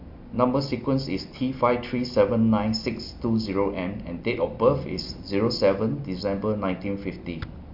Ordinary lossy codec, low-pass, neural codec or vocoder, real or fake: MP3, 48 kbps; 5.4 kHz; none; real